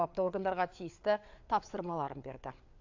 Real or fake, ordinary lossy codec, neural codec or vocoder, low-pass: fake; AAC, 48 kbps; codec, 16 kHz, 4 kbps, FreqCodec, larger model; 7.2 kHz